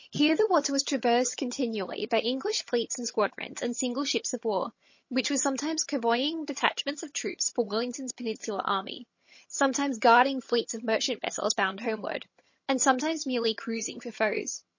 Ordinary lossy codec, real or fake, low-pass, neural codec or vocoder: MP3, 32 kbps; fake; 7.2 kHz; vocoder, 22.05 kHz, 80 mel bands, HiFi-GAN